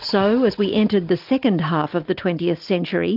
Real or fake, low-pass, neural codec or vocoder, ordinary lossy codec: real; 5.4 kHz; none; Opus, 32 kbps